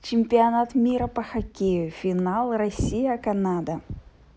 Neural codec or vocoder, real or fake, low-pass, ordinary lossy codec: none; real; none; none